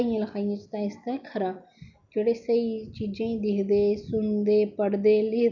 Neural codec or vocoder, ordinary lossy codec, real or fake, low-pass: none; none; real; 7.2 kHz